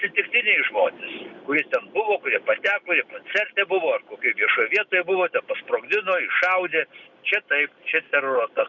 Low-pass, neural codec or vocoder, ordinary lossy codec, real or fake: 7.2 kHz; none; Opus, 64 kbps; real